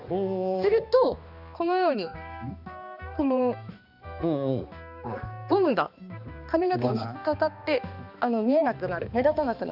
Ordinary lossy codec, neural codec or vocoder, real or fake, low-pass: none; codec, 16 kHz, 2 kbps, X-Codec, HuBERT features, trained on balanced general audio; fake; 5.4 kHz